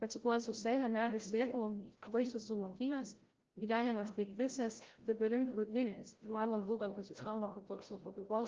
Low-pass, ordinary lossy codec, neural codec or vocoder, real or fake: 7.2 kHz; Opus, 16 kbps; codec, 16 kHz, 0.5 kbps, FreqCodec, larger model; fake